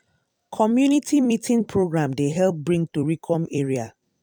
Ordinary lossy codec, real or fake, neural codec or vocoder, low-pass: none; fake; vocoder, 44.1 kHz, 128 mel bands every 256 samples, BigVGAN v2; 19.8 kHz